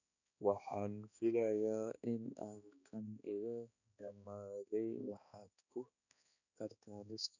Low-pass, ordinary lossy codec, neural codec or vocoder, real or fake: 7.2 kHz; none; codec, 16 kHz, 2 kbps, X-Codec, HuBERT features, trained on balanced general audio; fake